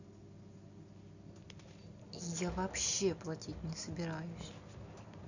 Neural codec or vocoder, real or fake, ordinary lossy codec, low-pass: none; real; none; 7.2 kHz